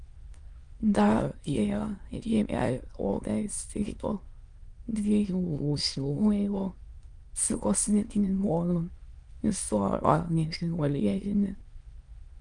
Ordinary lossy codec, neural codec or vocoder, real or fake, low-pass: Opus, 32 kbps; autoencoder, 22.05 kHz, a latent of 192 numbers a frame, VITS, trained on many speakers; fake; 9.9 kHz